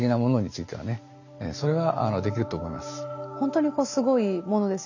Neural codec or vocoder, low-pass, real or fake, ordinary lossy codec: none; 7.2 kHz; real; none